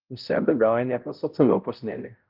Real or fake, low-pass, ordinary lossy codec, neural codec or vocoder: fake; 5.4 kHz; Opus, 32 kbps; codec, 16 kHz, 0.5 kbps, X-Codec, HuBERT features, trained on balanced general audio